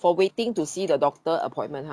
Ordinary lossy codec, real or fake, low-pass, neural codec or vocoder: none; real; none; none